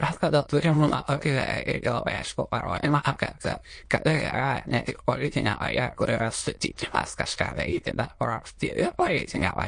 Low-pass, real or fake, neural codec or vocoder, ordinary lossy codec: 9.9 kHz; fake; autoencoder, 22.05 kHz, a latent of 192 numbers a frame, VITS, trained on many speakers; MP3, 48 kbps